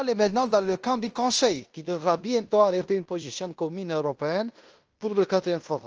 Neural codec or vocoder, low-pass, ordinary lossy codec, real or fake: codec, 16 kHz in and 24 kHz out, 0.9 kbps, LongCat-Audio-Codec, fine tuned four codebook decoder; 7.2 kHz; Opus, 24 kbps; fake